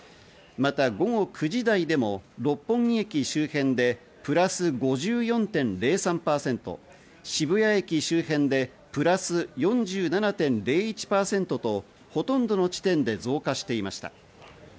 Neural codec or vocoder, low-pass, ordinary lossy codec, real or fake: none; none; none; real